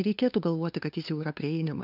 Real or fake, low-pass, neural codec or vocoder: fake; 5.4 kHz; codec, 16 kHz, 2 kbps, FunCodec, trained on LibriTTS, 25 frames a second